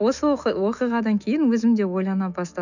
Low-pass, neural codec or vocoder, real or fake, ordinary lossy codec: 7.2 kHz; autoencoder, 48 kHz, 128 numbers a frame, DAC-VAE, trained on Japanese speech; fake; none